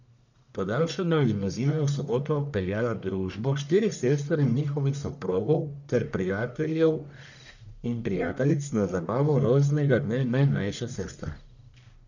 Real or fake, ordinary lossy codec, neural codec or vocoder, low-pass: fake; none; codec, 44.1 kHz, 1.7 kbps, Pupu-Codec; 7.2 kHz